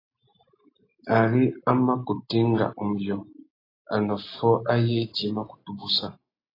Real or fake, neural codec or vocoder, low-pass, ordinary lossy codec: real; none; 5.4 kHz; AAC, 24 kbps